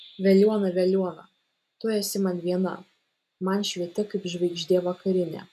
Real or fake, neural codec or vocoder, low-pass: real; none; 14.4 kHz